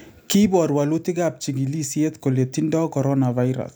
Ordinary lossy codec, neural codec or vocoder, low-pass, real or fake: none; none; none; real